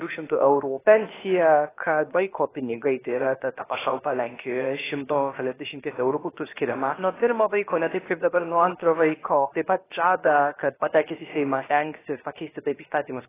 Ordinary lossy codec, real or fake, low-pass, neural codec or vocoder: AAC, 16 kbps; fake; 3.6 kHz; codec, 16 kHz, 0.7 kbps, FocalCodec